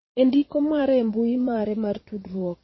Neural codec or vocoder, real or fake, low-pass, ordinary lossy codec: vocoder, 22.05 kHz, 80 mel bands, Vocos; fake; 7.2 kHz; MP3, 24 kbps